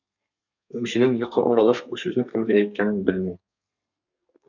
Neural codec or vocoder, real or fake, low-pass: codec, 32 kHz, 1.9 kbps, SNAC; fake; 7.2 kHz